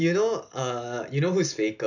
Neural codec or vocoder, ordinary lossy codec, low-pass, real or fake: none; none; 7.2 kHz; real